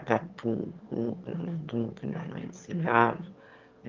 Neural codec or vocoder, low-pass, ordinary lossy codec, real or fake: autoencoder, 22.05 kHz, a latent of 192 numbers a frame, VITS, trained on one speaker; 7.2 kHz; Opus, 24 kbps; fake